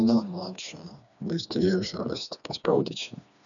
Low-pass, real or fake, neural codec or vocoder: 7.2 kHz; fake; codec, 16 kHz, 2 kbps, FreqCodec, smaller model